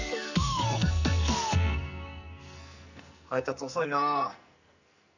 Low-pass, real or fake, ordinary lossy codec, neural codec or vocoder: 7.2 kHz; fake; none; codec, 44.1 kHz, 2.6 kbps, SNAC